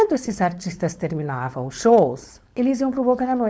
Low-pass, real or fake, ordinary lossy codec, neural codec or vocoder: none; fake; none; codec, 16 kHz, 4.8 kbps, FACodec